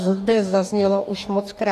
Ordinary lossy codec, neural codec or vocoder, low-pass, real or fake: AAC, 96 kbps; codec, 44.1 kHz, 2.6 kbps, DAC; 14.4 kHz; fake